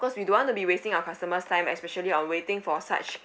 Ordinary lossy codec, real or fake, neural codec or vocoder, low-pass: none; real; none; none